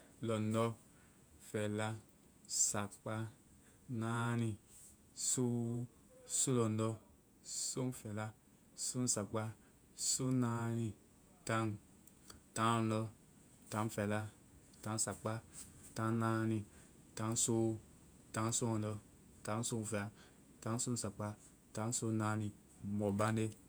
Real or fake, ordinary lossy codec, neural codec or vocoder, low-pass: fake; none; vocoder, 48 kHz, 128 mel bands, Vocos; none